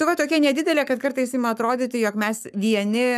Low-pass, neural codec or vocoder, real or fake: 14.4 kHz; codec, 44.1 kHz, 7.8 kbps, Pupu-Codec; fake